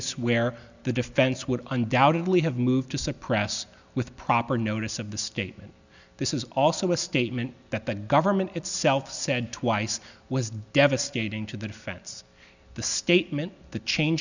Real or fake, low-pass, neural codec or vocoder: real; 7.2 kHz; none